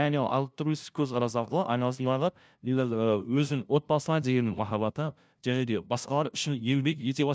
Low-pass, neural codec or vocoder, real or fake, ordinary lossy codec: none; codec, 16 kHz, 0.5 kbps, FunCodec, trained on LibriTTS, 25 frames a second; fake; none